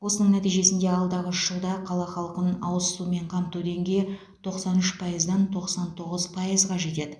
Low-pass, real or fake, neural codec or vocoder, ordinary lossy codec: none; real; none; none